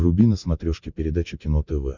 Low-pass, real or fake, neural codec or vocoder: 7.2 kHz; real; none